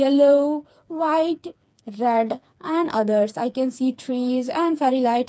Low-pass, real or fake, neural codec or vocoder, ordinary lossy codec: none; fake; codec, 16 kHz, 4 kbps, FreqCodec, smaller model; none